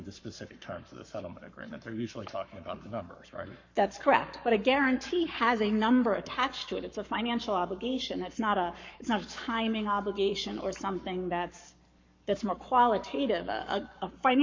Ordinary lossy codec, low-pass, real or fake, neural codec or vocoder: MP3, 48 kbps; 7.2 kHz; fake; codec, 44.1 kHz, 7.8 kbps, Pupu-Codec